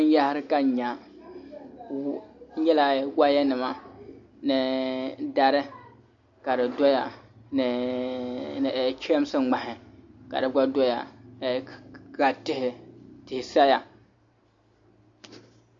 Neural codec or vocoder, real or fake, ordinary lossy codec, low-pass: none; real; MP3, 48 kbps; 7.2 kHz